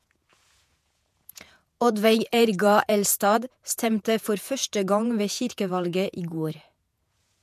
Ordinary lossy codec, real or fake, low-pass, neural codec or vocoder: MP3, 96 kbps; fake; 14.4 kHz; vocoder, 48 kHz, 128 mel bands, Vocos